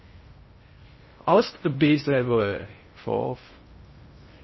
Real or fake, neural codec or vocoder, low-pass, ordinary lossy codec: fake; codec, 16 kHz in and 24 kHz out, 0.6 kbps, FocalCodec, streaming, 2048 codes; 7.2 kHz; MP3, 24 kbps